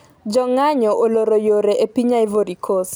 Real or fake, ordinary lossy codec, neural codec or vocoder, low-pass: real; none; none; none